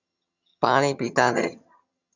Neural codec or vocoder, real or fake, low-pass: vocoder, 22.05 kHz, 80 mel bands, HiFi-GAN; fake; 7.2 kHz